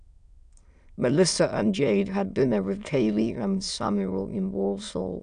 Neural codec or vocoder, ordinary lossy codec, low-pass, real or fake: autoencoder, 22.05 kHz, a latent of 192 numbers a frame, VITS, trained on many speakers; none; 9.9 kHz; fake